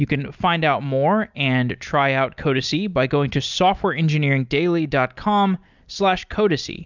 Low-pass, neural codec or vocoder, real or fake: 7.2 kHz; none; real